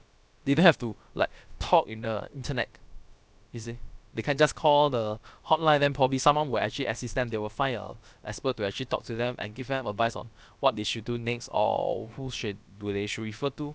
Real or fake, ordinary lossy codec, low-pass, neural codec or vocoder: fake; none; none; codec, 16 kHz, about 1 kbps, DyCAST, with the encoder's durations